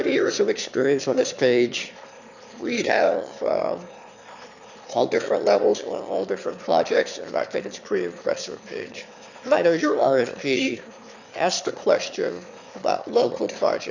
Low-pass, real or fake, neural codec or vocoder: 7.2 kHz; fake; autoencoder, 22.05 kHz, a latent of 192 numbers a frame, VITS, trained on one speaker